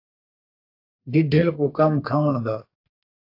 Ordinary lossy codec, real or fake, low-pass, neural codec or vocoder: AAC, 32 kbps; fake; 5.4 kHz; codec, 32 kHz, 1.9 kbps, SNAC